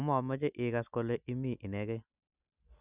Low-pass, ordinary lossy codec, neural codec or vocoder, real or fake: 3.6 kHz; none; none; real